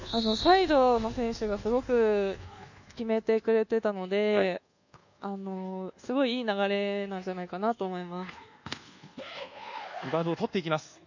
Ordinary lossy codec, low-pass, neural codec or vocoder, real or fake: none; 7.2 kHz; codec, 24 kHz, 1.2 kbps, DualCodec; fake